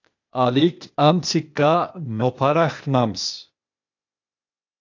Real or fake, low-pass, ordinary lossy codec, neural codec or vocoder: fake; 7.2 kHz; AAC, 48 kbps; codec, 16 kHz, 0.8 kbps, ZipCodec